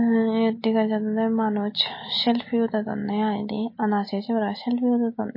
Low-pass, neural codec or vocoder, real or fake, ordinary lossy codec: 5.4 kHz; none; real; MP3, 24 kbps